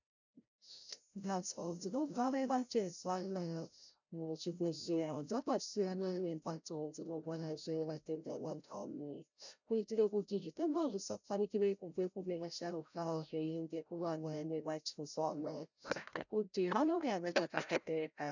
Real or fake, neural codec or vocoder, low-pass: fake; codec, 16 kHz, 0.5 kbps, FreqCodec, larger model; 7.2 kHz